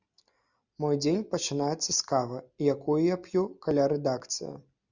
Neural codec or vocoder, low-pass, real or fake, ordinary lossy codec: none; 7.2 kHz; real; Opus, 64 kbps